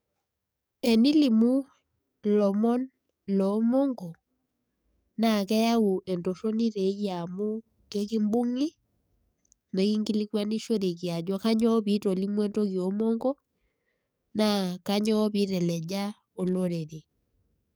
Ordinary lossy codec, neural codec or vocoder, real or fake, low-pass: none; codec, 44.1 kHz, 7.8 kbps, DAC; fake; none